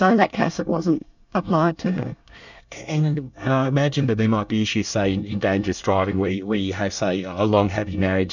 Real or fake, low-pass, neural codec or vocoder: fake; 7.2 kHz; codec, 24 kHz, 1 kbps, SNAC